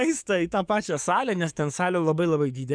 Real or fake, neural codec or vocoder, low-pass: fake; codec, 44.1 kHz, 7.8 kbps, DAC; 9.9 kHz